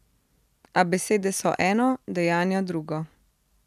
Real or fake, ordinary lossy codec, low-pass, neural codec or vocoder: real; none; 14.4 kHz; none